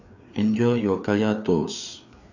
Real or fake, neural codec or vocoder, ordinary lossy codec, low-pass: fake; codec, 16 kHz, 4 kbps, FreqCodec, larger model; none; 7.2 kHz